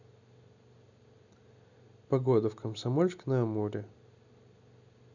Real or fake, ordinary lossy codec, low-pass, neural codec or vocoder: real; none; 7.2 kHz; none